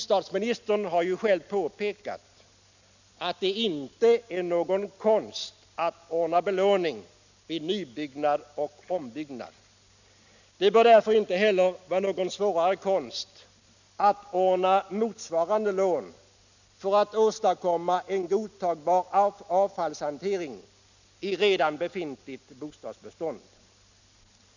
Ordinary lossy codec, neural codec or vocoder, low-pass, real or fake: none; none; 7.2 kHz; real